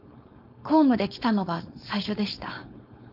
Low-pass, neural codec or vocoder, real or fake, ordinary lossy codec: 5.4 kHz; codec, 16 kHz, 4.8 kbps, FACodec; fake; none